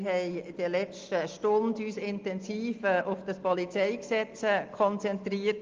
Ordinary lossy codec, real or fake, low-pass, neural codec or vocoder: Opus, 32 kbps; real; 7.2 kHz; none